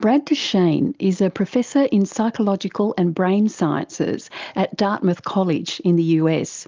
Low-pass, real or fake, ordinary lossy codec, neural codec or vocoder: 7.2 kHz; real; Opus, 32 kbps; none